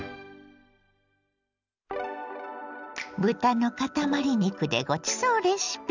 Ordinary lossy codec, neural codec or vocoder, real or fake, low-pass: none; none; real; 7.2 kHz